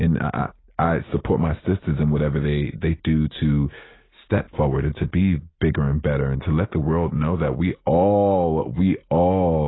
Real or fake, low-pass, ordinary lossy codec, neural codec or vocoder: real; 7.2 kHz; AAC, 16 kbps; none